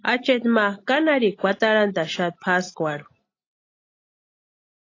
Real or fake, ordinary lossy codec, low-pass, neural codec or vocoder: real; AAC, 32 kbps; 7.2 kHz; none